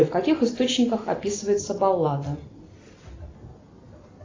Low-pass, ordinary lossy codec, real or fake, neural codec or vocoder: 7.2 kHz; AAC, 32 kbps; real; none